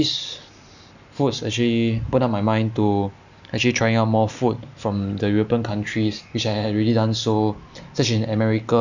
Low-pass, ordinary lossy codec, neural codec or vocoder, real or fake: 7.2 kHz; none; none; real